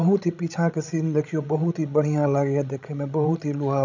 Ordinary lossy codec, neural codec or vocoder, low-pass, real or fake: none; codec, 16 kHz, 16 kbps, FreqCodec, larger model; 7.2 kHz; fake